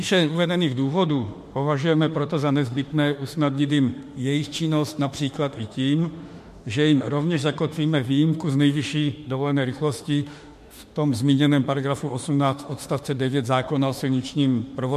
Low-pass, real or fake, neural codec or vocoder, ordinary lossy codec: 14.4 kHz; fake; autoencoder, 48 kHz, 32 numbers a frame, DAC-VAE, trained on Japanese speech; MP3, 64 kbps